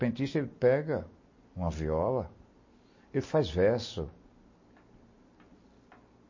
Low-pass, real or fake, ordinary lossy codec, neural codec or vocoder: 7.2 kHz; real; MP3, 32 kbps; none